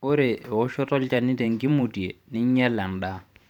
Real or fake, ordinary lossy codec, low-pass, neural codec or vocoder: fake; none; 19.8 kHz; vocoder, 44.1 kHz, 128 mel bands every 512 samples, BigVGAN v2